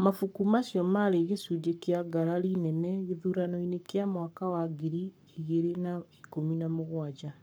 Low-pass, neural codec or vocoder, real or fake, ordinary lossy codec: none; codec, 44.1 kHz, 7.8 kbps, Pupu-Codec; fake; none